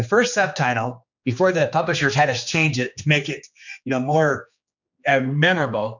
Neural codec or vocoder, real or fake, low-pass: codec, 16 kHz, 2 kbps, X-Codec, HuBERT features, trained on general audio; fake; 7.2 kHz